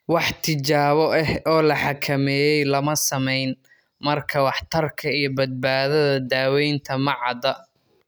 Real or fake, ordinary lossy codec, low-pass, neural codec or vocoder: real; none; none; none